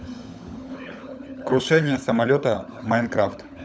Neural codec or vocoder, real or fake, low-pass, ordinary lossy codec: codec, 16 kHz, 16 kbps, FunCodec, trained on LibriTTS, 50 frames a second; fake; none; none